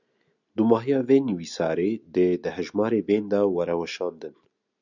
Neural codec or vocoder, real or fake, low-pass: none; real; 7.2 kHz